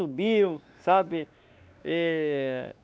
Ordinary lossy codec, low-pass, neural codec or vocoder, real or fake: none; none; codec, 16 kHz, 2 kbps, X-Codec, WavLM features, trained on Multilingual LibriSpeech; fake